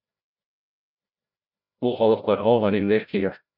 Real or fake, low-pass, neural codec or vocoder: fake; 5.4 kHz; codec, 16 kHz, 0.5 kbps, FreqCodec, larger model